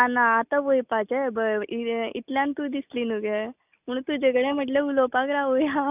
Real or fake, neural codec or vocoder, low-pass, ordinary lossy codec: real; none; 3.6 kHz; none